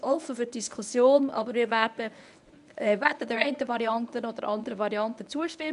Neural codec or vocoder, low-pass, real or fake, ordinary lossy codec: codec, 24 kHz, 0.9 kbps, WavTokenizer, medium speech release version 1; 10.8 kHz; fake; none